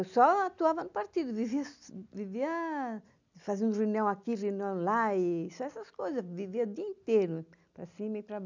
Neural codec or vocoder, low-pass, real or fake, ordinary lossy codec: none; 7.2 kHz; real; none